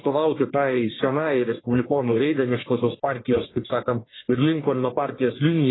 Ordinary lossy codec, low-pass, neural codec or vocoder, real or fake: AAC, 16 kbps; 7.2 kHz; codec, 44.1 kHz, 1.7 kbps, Pupu-Codec; fake